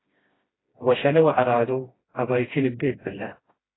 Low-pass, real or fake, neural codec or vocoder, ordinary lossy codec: 7.2 kHz; fake; codec, 16 kHz, 1 kbps, FreqCodec, smaller model; AAC, 16 kbps